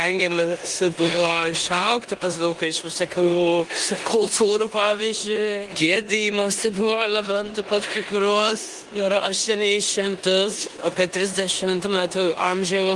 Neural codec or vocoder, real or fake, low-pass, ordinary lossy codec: codec, 16 kHz in and 24 kHz out, 0.9 kbps, LongCat-Audio-Codec, four codebook decoder; fake; 10.8 kHz; Opus, 24 kbps